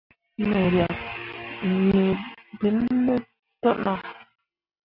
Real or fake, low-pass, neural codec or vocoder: real; 5.4 kHz; none